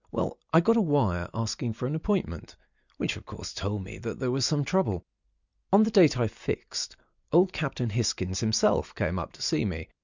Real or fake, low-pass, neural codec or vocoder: real; 7.2 kHz; none